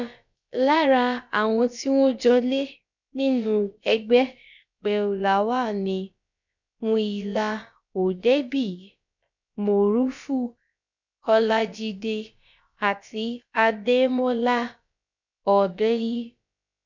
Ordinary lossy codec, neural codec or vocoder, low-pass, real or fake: none; codec, 16 kHz, about 1 kbps, DyCAST, with the encoder's durations; 7.2 kHz; fake